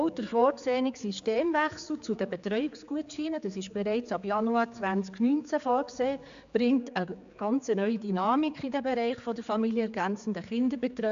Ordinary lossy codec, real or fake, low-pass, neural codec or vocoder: AAC, 96 kbps; fake; 7.2 kHz; codec, 16 kHz, 4 kbps, X-Codec, HuBERT features, trained on general audio